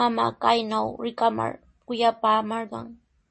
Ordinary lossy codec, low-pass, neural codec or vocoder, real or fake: MP3, 32 kbps; 10.8 kHz; none; real